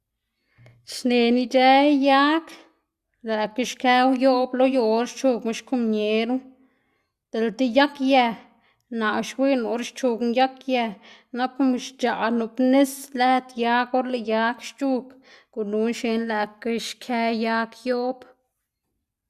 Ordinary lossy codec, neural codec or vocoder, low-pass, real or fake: Opus, 64 kbps; none; 14.4 kHz; real